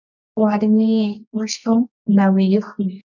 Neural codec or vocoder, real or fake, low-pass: codec, 24 kHz, 0.9 kbps, WavTokenizer, medium music audio release; fake; 7.2 kHz